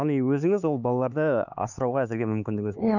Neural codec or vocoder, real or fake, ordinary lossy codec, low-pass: codec, 16 kHz, 4 kbps, X-Codec, HuBERT features, trained on LibriSpeech; fake; none; 7.2 kHz